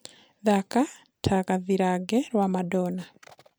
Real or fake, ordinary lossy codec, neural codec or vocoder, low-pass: real; none; none; none